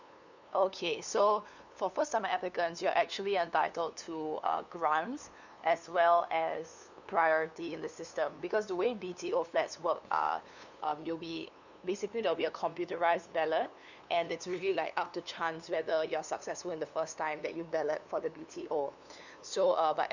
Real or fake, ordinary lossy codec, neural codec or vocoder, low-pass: fake; none; codec, 16 kHz, 2 kbps, FunCodec, trained on LibriTTS, 25 frames a second; 7.2 kHz